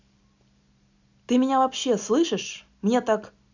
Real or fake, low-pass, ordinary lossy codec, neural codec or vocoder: real; 7.2 kHz; none; none